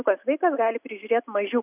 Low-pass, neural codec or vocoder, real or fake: 3.6 kHz; none; real